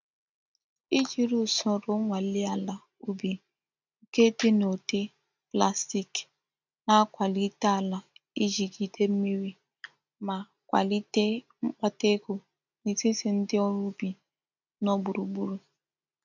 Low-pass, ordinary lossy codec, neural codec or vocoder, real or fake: 7.2 kHz; none; none; real